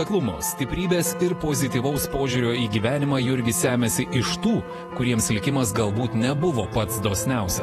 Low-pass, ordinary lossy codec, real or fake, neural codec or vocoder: 19.8 kHz; AAC, 32 kbps; fake; vocoder, 48 kHz, 128 mel bands, Vocos